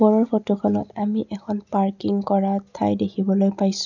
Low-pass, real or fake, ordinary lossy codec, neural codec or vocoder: 7.2 kHz; real; none; none